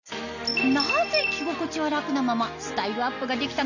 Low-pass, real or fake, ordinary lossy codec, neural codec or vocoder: 7.2 kHz; real; none; none